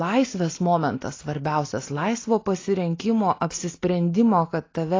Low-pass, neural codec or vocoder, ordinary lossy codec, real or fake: 7.2 kHz; none; AAC, 32 kbps; real